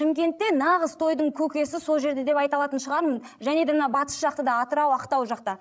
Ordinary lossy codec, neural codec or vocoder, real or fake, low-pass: none; codec, 16 kHz, 16 kbps, FreqCodec, larger model; fake; none